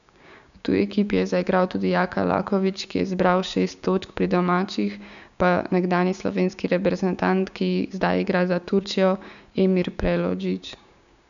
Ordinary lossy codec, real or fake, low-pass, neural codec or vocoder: none; fake; 7.2 kHz; codec, 16 kHz, 6 kbps, DAC